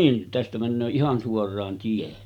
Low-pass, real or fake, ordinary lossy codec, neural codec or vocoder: 19.8 kHz; real; none; none